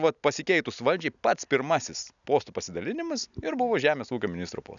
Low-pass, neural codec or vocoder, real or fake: 7.2 kHz; none; real